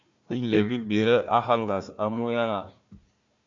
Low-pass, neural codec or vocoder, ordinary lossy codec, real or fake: 7.2 kHz; codec, 16 kHz, 1 kbps, FunCodec, trained on Chinese and English, 50 frames a second; AAC, 64 kbps; fake